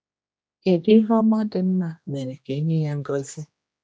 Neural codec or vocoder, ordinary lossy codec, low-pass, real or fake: codec, 16 kHz, 1 kbps, X-Codec, HuBERT features, trained on general audio; none; none; fake